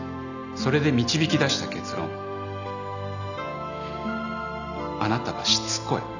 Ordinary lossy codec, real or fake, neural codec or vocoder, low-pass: none; real; none; 7.2 kHz